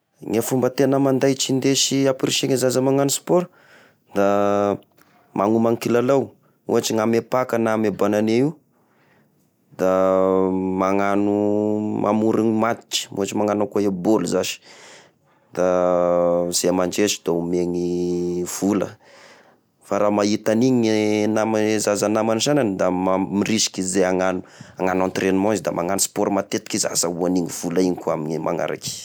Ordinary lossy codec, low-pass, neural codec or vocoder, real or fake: none; none; none; real